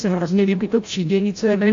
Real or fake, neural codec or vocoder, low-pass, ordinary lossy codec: fake; codec, 16 kHz, 0.5 kbps, FreqCodec, larger model; 7.2 kHz; AAC, 48 kbps